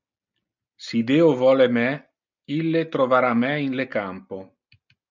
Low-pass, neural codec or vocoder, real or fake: 7.2 kHz; none; real